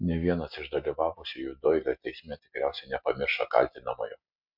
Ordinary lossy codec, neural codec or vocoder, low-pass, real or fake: MP3, 48 kbps; none; 5.4 kHz; real